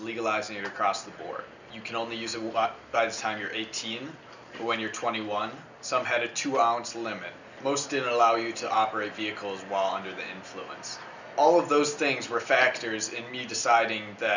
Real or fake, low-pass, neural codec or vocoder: real; 7.2 kHz; none